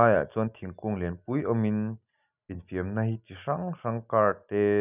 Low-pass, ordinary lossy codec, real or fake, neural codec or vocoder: 3.6 kHz; none; real; none